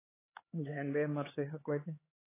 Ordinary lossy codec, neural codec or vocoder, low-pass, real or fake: AAC, 16 kbps; codec, 16 kHz, 4 kbps, X-Codec, HuBERT features, trained on LibriSpeech; 3.6 kHz; fake